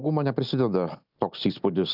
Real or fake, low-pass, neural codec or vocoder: real; 5.4 kHz; none